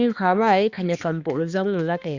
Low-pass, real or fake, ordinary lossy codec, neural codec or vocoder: 7.2 kHz; fake; Opus, 64 kbps; codec, 16 kHz, 2 kbps, X-Codec, HuBERT features, trained on balanced general audio